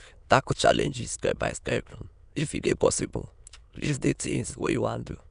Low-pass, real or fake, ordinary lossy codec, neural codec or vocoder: 9.9 kHz; fake; none; autoencoder, 22.05 kHz, a latent of 192 numbers a frame, VITS, trained on many speakers